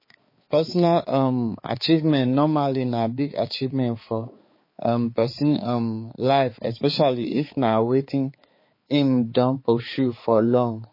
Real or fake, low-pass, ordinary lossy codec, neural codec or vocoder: fake; 5.4 kHz; MP3, 24 kbps; codec, 16 kHz, 4 kbps, X-Codec, HuBERT features, trained on balanced general audio